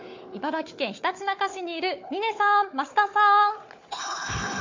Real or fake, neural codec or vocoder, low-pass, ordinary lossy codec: fake; codec, 16 kHz, 4 kbps, FunCodec, trained on Chinese and English, 50 frames a second; 7.2 kHz; MP3, 48 kbps